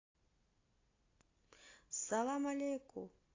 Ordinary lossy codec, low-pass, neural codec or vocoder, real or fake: AAC, 32 kbps; 7.2 kHz; none; real